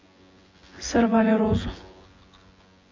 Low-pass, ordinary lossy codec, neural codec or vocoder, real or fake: 7.2 kHz; MP3, 32 kbps; vocoder, 24 kHz, 100 mel bands, Vocos; fake